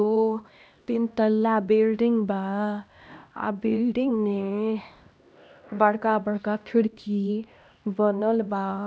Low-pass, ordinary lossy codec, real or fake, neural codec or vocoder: none; none; fake; codec, 16 kHz, 1 kbps, X-Codec, HuBERT features, trained on LibriSpeech